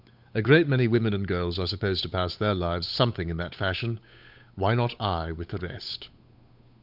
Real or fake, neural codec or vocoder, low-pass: fake; codec, 16 kHz, 8 kbps, FunCodec, trained on Chinese and English, 25 frames a second; 5.4 kHz